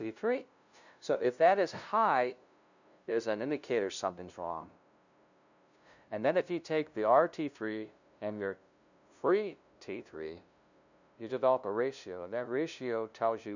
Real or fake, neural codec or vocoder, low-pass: fake; codec, 16 kHz, 0.5 kbps, FunCodec, trained on LibriTTS, 25 frames a second; 7.2 kHz